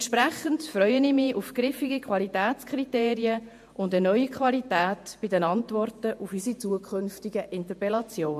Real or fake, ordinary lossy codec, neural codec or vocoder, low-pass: fake; MP3, 64 kbps; vocoder, 48 kHz, 128 mel bands, Vocos; 14.4 kHz